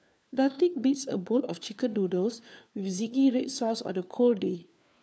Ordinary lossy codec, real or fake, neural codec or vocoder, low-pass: none; fake; codec, 16 kHz, 4 kbps, FunCodec, trained on LibriTTS, 50 frames a second; none